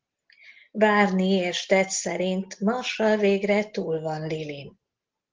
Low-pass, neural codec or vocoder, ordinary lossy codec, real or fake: 7.2 kHz; none; Opus, 16 kbps; real